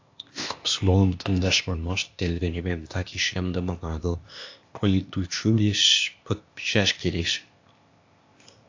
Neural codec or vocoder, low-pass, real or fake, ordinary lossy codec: codec, 16 kHz, 0.8 kbps, ZipCodec; 7.2 kHz; fake; AAC, 48 kbps